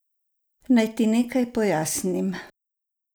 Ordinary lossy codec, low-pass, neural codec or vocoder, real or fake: none; none; none; real